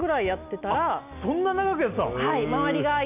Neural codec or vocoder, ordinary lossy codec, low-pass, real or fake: none; AAC, 32 kbps; 3.6 kHz; real